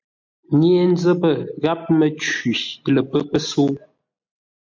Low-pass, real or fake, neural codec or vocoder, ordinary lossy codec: 7.2 kHz; real; none; MP3, 48 kbps